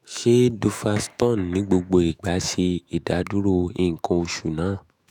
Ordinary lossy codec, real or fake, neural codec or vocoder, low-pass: none; fake; autoencoder, 48 kHz, 128 numbers a frame, DAC-VAE, trained on Japanese speech; 19.8 kHz